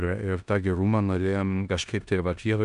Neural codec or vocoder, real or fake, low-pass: codec, 16 kHz in and 24 kHz out, 0.9 kbps, LongCat-Audio-Codec, four codebook decoder; fake; 10.8 kHz